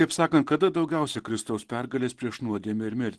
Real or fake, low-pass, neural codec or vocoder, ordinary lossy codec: real; 10.8 kHz; none; Opus, 16 kbps